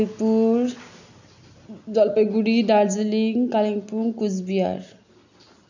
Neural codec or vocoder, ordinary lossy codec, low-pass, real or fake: none; none; 7.2 kHz; real